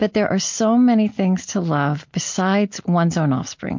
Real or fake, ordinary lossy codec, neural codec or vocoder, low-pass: real; MP3, 48 kbps; none; 7.2 kHz